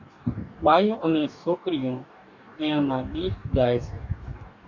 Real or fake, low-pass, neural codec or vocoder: fake; 7.2 kHz; codec, 44.1 kHz, 2.6 kbps, DAC